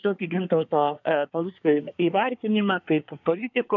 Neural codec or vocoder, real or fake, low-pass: codec, 24 kHz, 1 kbps, SNAC; fake; 7.2 kHz